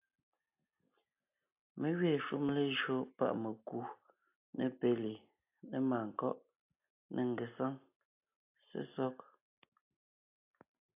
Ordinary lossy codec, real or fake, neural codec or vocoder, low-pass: AAC, 32 kbps; real; none; 3.6 kHz